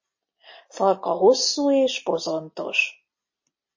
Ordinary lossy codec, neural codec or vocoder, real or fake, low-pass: MP3, 32 kbps; none; real; 7.2 kHz